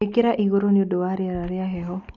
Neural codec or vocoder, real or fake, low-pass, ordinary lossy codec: none; real; 7.2 kHz; none